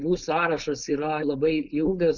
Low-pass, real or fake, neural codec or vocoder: 7.2 kHz; fake; codec, 16 kHz, 4.8 kbps, FACodec